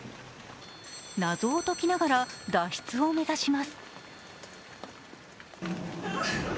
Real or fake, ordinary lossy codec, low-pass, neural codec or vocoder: real; none; none; none